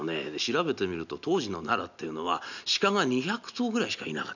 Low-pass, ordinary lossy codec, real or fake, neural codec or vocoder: 7.2 kHz; none; real; none